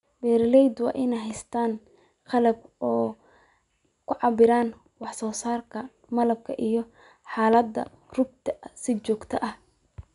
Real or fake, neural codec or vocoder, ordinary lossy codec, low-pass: real; none; none; 10.8 kHz